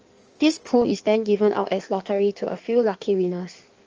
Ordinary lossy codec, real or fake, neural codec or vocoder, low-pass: Opus, 24 kbps; fake; codec, 16 kHz in and 24 kHz out, 1.1 kbps, FireRedTTS-2 codec; 7.2 kHz